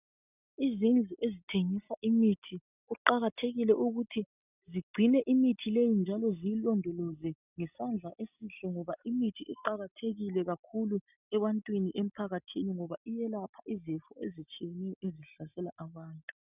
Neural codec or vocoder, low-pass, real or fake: none; 3.6 kHz; real